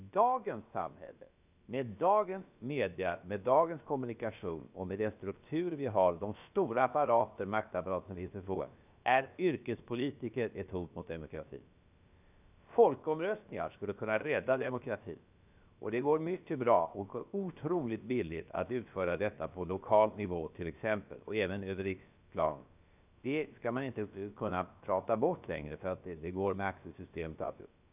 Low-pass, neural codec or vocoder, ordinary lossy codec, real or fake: 3.6 kHz; codec, 16 kHz, about 1 kbps, DyCAST, with the encoder's durations; none; fake